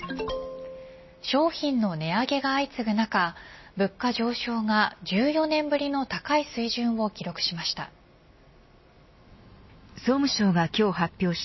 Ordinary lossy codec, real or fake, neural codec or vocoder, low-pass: MP3, 24 kbps; real; none; 7.2 kHz